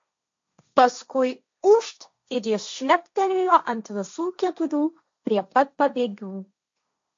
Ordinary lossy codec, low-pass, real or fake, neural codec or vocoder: MP3, 48 kbps; 7.2 kHz; fake; codec, 16 kHz, 1.1 kbps, Voila-Tokenizer